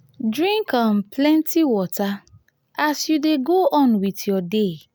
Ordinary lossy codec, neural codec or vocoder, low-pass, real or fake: none; none; none; real